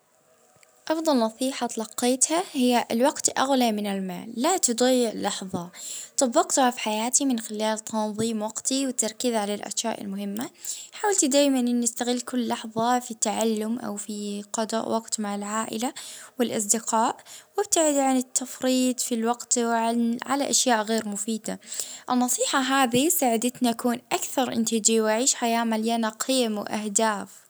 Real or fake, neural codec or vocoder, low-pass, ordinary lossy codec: real; none; none; none